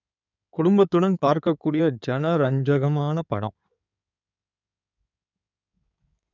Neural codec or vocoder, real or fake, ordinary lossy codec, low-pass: codec, 16 kHz in and 24 kHz out, 2.2 kbps, FireRedTTS-2 codec; fake; none; 7.2 kHz